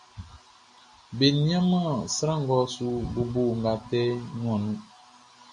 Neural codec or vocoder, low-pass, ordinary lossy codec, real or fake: none; 10.8 kHz; MP3, 48 kbps; real